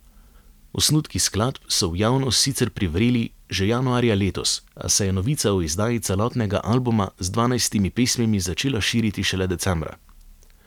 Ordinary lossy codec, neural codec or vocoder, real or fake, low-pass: none; none; real; 19.8 kHz